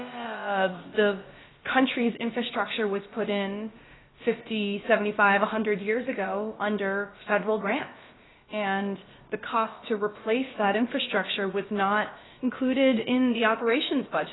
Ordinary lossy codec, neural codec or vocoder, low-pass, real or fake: AAC, 16 kbps; codec, 16 kHz, about 1 kbps, DyCAST, with the encoder's durations; 7.2 kHz; fake